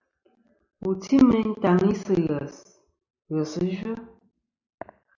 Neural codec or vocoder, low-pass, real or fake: none; 7.2 kHz; real